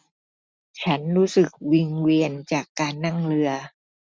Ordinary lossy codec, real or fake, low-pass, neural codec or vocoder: none; real; none; none